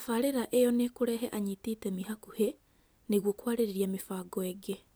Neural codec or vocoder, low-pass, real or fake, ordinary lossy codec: none; none; real; none